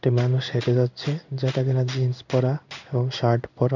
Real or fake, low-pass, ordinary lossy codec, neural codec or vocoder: fake; 7.2 kHz; none; codec, 16 kHz in and 24 kHz out, 1 kbps, XY-Tokenizer